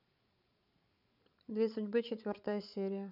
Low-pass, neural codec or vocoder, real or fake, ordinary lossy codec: 5.4 kHz; codec, 16 kHz, 8 kbps, FreqCodec, larger model; fake; none